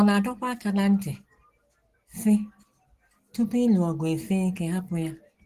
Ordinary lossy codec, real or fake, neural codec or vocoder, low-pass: Opus, 16 kbps; real; none; 14.4 kHz